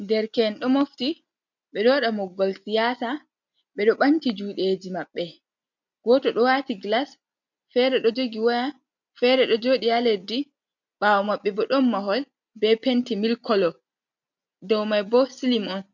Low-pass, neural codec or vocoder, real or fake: 7.2 kHz; none; real